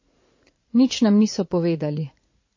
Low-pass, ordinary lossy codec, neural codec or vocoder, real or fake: 7.2 kHz; MP3, 32 kbps; vocoder, 44.1 kHz, 80 mel bands, Vocos; fake